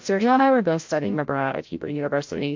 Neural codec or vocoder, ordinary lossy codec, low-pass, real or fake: codec, 16 kHz, 0.5 kbps, FreqCodec, larger model; MP3, 48 kbps; 7.2 kHz; fake